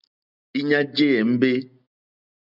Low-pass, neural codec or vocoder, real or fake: 5.4 kHz; none; real